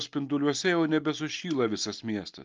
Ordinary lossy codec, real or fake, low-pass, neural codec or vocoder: Opus, 32 kbps; real; 7.2 kHz; none